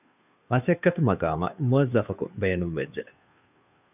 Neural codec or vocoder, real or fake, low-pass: codec, 16 kHz, 2 kbps, FunCodec, trained on Chinese and English, 25 frames a second; fake; 3.6 kHz